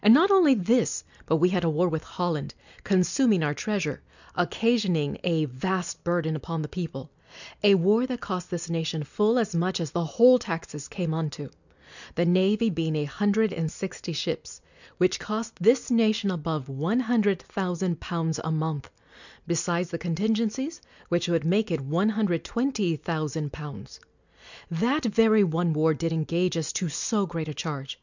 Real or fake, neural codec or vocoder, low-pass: real; none; 7.2 kHz